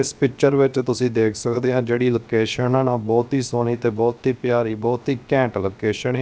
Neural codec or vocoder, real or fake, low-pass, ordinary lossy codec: codec, 16 kHz, 0.7 kbps, FocalCodec; fake; none; none